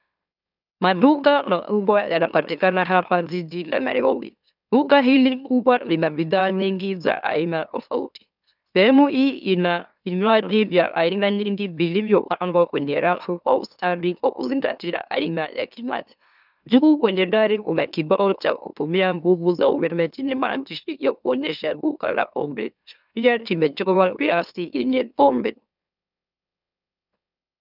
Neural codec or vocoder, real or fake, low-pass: autoencoder, 44.1 kHz, a latent of 192 numbers a frame, MeloTTS; fake; 5.4 kHz